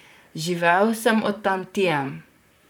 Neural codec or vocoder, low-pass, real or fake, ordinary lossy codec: vocoder, 44.1 kHz, 128 mel bands, Pupu-Vocoder; none; fake; none